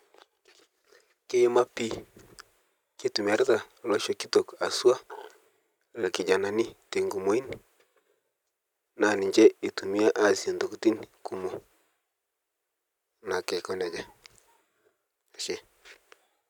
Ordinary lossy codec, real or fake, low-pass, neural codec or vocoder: none; real; 19.8 kHz; none